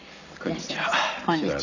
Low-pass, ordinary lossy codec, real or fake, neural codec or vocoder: 7.2 kHz; none; real; none